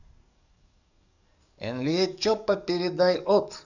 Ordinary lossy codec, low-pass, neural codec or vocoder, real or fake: none; 7.2 kHz; codec, 44.1 kHz, 7.8 kbps, DAC; fake